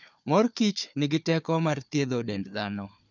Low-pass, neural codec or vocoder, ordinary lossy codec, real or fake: 7.2 kHz; codec, 16 kHz, 4 kbps, FunCodec, trained on Chinese and English, 50 frames a second; none; fake